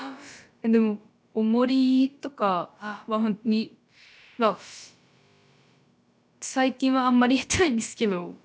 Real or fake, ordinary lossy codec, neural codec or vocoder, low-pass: fake; none; codec, 16 kHz, about 1 kbps, DyCAST, with the encoder's durations; none